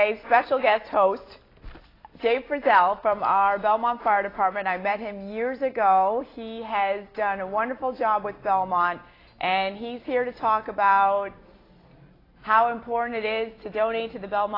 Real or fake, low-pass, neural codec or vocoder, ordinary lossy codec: real; 5.4 kHz; none; AAC, 24 kbps